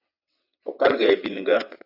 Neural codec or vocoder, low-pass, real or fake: vocoder, 22.05 kHz, 80 mel bands, WaveNeXt; 5.4 kHz; fake